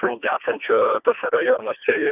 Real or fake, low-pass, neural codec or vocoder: fake; 3.6 kHz; codec, 24 kHz, 1.5 kbps, HILCodec